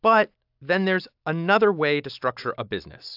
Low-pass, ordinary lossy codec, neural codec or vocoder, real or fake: 5.4 kHz; AAC, 48 kbps; none; real